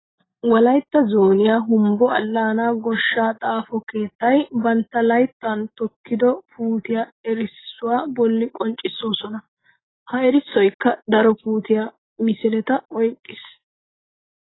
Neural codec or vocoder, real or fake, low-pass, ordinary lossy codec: none; real; 7.2 kHz; AAC, 16 kbps